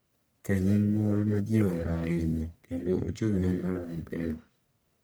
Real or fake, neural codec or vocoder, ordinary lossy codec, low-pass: fake; codec, 44.1 kHz, 1.7 kbps, Pupu-Codec; none; none